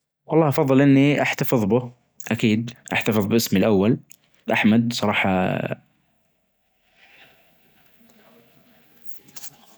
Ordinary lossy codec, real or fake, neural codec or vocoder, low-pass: none; real; none; none